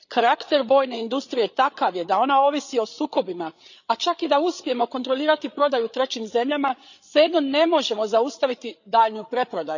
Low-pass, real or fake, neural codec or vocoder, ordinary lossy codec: 7.2 kHz; fake; codec, 16 kHz, 8 kbps, FreqCodec, larger model; none